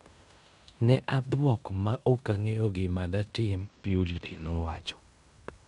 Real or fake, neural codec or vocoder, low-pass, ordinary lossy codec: fake; codec, 16 kHz in and 24 kHz out, 0.9 kbps, LongCat-Audio-Codec, fine tuned four codebook decoder; 10.8 kHz; none